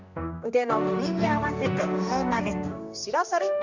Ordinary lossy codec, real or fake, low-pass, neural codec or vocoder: Opus, 64 kbps; fake; 7.2 kHz; codec, 16 kHz, 1 kbps, X-Codec, HuBERT features, trained on general audio